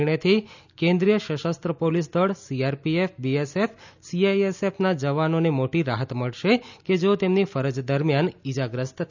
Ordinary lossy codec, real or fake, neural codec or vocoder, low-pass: none; real; none; 7.2 kHz